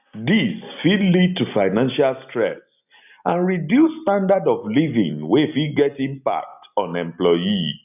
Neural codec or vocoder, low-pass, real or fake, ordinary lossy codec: none; 3.6 kHz; real; none